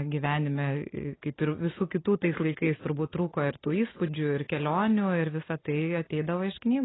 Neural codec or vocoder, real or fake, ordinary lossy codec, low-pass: none; real; AAC, 16 kbps; 7.2 kHz